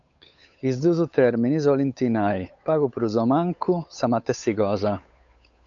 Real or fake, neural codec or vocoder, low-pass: fake; codec, 16 kHz, 8 kbps, FunCodec, trained on Chinese and English, 25 frames a second; 7.2 kHz